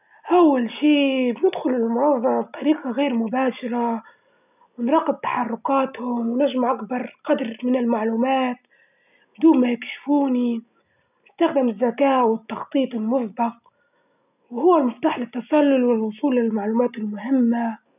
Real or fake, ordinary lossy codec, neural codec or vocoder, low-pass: real; none; none; 3.6 kHz